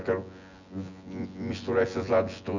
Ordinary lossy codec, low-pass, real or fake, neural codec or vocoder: none; 7.2 kHz; fake; vocoder, 24 kHz, 100 mel bands, Vocos